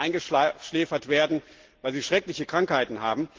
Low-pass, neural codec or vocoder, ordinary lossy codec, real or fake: 7.2 kHz; none; Opus, 16 kbps; real